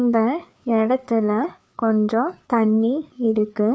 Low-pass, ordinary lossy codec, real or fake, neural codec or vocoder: none; none; fake; codec, 16 kHz, 4 kbps, FreqCodec, larger model